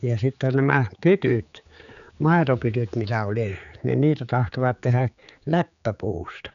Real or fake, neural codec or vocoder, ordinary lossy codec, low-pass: fake; codec, 16 kHz, 4 kbps, X-Codec, HuBERT features, trained on general audio; none; 7.2 kHz